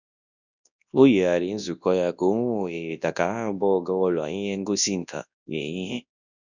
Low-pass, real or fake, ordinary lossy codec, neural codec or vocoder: 7.2 kHz; fake; none; codec, 24 kHz, 0.9 kbps, WavTokenizer, large speech release